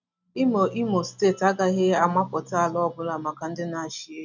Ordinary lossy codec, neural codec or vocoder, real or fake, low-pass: none; none; real; 7.2 kHz